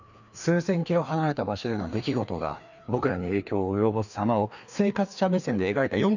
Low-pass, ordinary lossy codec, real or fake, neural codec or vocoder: 7.2 kHz; none; fake; codec, 16 kHz, 2 kbps, FreqCodec, larger model